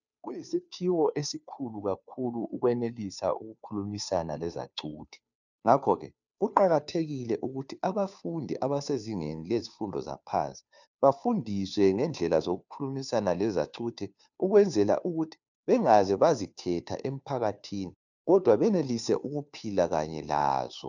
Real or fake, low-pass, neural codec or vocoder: fake; 7.2 kHz; codec, 16 kHz, 2 kbps, FunCodec, trained on Chinese and English, 25 frames a second